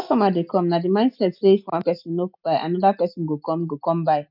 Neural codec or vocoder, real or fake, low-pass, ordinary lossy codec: none; real; 5.4 kHz; none